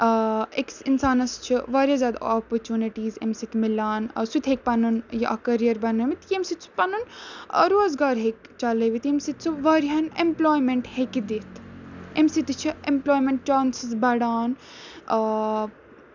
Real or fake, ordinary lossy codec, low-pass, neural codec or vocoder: real; none; 7.2 kHz; none